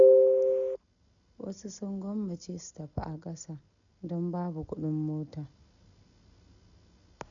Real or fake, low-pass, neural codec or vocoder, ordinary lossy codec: real; 7.2 kHz; none; none